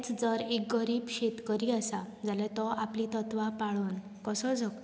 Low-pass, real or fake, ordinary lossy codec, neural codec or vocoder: none; real; none; none